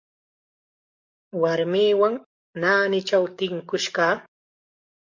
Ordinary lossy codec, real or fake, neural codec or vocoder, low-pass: MP3, 48 kbps; fake; codec, 44.1 kHz, 7.8 kbps, DAC; 7.2 kHz